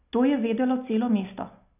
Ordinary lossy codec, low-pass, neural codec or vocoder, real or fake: none; 3.6 kHz; none; real